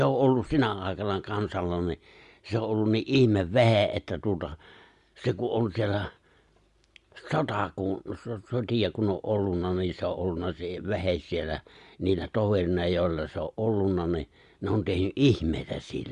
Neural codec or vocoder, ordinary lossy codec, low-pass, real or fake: none; Opus, 64 kbps; 10.8 kHz; real